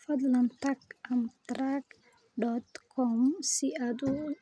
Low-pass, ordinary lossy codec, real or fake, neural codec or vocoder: none; none; real; none